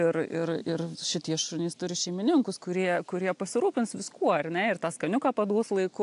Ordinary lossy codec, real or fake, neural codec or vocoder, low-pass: AAC, 64 kbps; real; none; 10.8 kHz